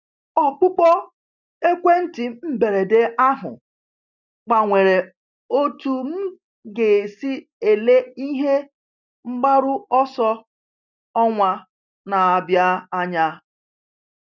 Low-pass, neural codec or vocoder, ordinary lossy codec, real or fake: 7.2 kHz; none; none; real